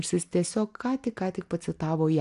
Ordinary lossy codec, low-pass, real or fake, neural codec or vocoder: AAC, 64 kbps; 10.8 kHz; real; none